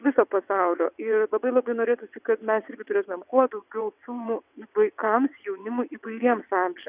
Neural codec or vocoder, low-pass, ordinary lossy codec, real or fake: vocoder, 22.05 kHz, 80 mel bands, WaveNeXt; 3.6 kHz; Opus, 64 kbps; fake